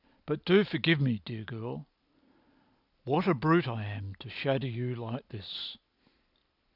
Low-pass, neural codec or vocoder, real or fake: 5.4 kHz; none; real